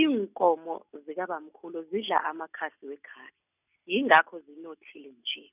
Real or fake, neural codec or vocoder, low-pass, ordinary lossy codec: real; none; 3.6 kHz; none